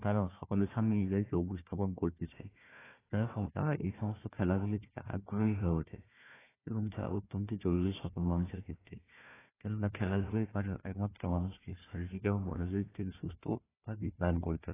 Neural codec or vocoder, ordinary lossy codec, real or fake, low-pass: codec, 16 kHz, 1 kbps, FunCodec, trained on Chinese and English, 50 frames a second; AAC, 16 kbps; fake; 3.6 kHz